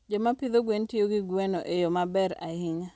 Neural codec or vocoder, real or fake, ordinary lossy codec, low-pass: none; real; none; none